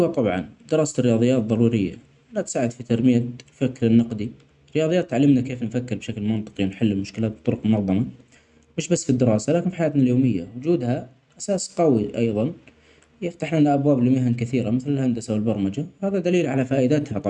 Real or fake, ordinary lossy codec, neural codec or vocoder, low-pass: real; none; none; 10.8 kHz